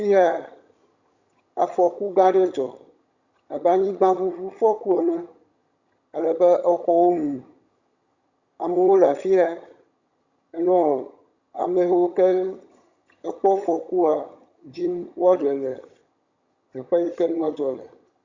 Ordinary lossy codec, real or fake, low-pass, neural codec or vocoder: Opus, 64 kbps; fake; 7.2 kHz; vocoder, 22.05 kHz, 80 mel bands, HiFi-GAN